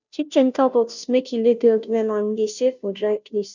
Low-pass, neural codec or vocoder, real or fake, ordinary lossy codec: 7.2 kHz; codec, 16 kHz, 0.5 kbps, FunCodec, trained on Chinese and English, 25 frames a second; fake; none